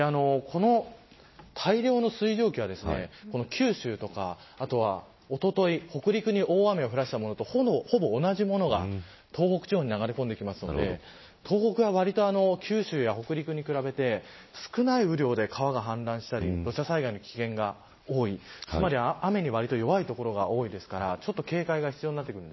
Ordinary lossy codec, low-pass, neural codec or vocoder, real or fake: MP3, 24 kbps; 7.2 kHz; none; real